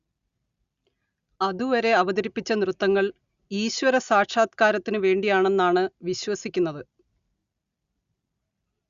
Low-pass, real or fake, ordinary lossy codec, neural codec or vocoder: 7.2 kHz; real; Opus, 64 kbps; none